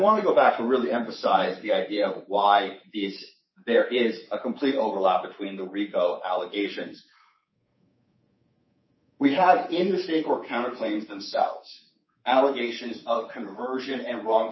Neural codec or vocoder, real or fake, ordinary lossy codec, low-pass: codec, 24 kHz, 3.1 kbps, DualCodec; fake; MP3, 24 kbps; 7.2 kHz